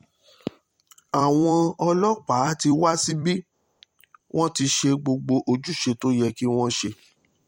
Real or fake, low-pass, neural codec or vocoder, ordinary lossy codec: fake; 19.8 kHz; vocoder, 44.1 kHz, 128 mel bands every 256 samples, BigVGAN v2; MP3, 64 kbps